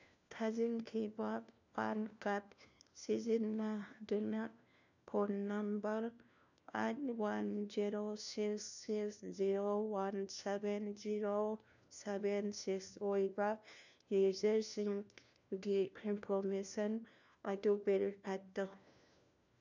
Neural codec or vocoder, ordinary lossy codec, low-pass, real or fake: codec, 16 kHz, 1 kbps, FunCodec, trained on LibriTTS, 50 frames a second; none; 7.2 kHz; fake